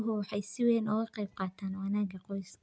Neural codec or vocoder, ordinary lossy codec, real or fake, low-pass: none; none; real; none